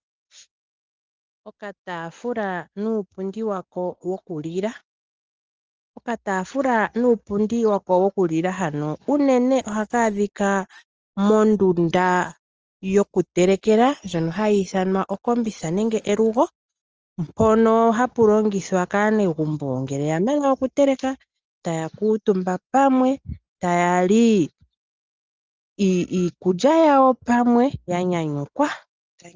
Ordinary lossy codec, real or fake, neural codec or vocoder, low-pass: Opus, 24 kbps; real; none; 7.2 kHz